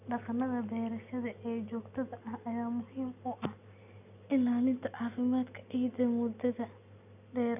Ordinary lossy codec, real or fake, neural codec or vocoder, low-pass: none; real; none; 3.6 kHz